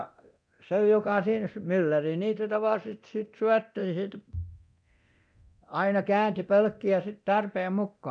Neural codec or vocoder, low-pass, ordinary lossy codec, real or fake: codec, 24 kHz, 0.9 kbps, DualCodec; 9.9 kHz; none; fake